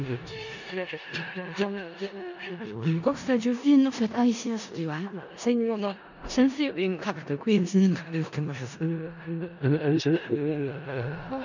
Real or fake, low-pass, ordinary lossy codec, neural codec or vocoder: fake; 7.2 kHz; none; codec, 16 kHz in and 24 kHz out, 0.4 kbps, LongCat-Audio-Codec, four codebook decoder